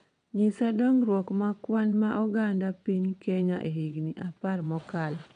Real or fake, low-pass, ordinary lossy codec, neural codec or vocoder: fake; 9.9 kHz; none; vocoder, 22.05 kHz, 80 mel bands, WaveNeXt